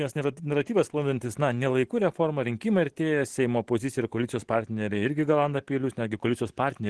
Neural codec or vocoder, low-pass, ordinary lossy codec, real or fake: none; 10.8 kHz; Opus, 16 kbps; real